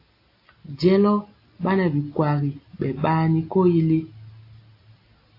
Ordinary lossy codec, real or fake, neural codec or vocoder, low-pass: AAC, 32 kbps; real; none; 5.4 kHz